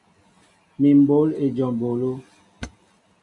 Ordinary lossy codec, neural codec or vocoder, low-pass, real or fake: MP3, 64 kbps; none; 10.8 kHz; real